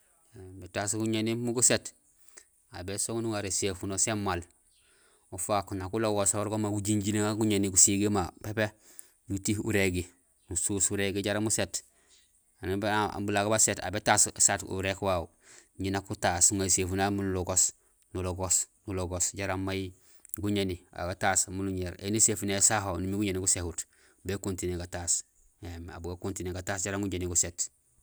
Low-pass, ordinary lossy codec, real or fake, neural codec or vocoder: none; none; real; none